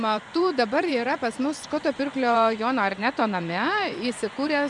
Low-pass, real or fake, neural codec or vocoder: 10.8 kHz; fake; vocoder, 24 kHz, 100 mel bands, Vocos